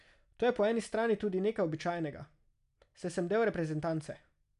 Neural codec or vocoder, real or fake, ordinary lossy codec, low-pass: none; real; none; 10.8 kHz